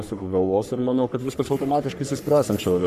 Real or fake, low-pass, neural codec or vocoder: fake; 14.4 kHz; codec, 44.1 kHz, 3.4 kbps, Pupu-Codec